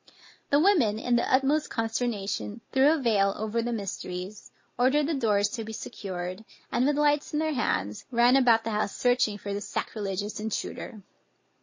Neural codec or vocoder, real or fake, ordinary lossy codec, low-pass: none; real; MP3, 32 kbps; 7.2 kHz